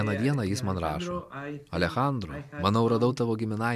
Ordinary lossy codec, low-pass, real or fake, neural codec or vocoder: MP3, 96 kbps; 14.4 kHz; real; none